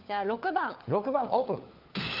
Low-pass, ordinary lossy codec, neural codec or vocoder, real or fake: 5.4 kHz; Opus, 32 kbps; codec, 16 kHz, 4 kbps, FunCodec, trained on LibriTTS, 50 frames a second; fake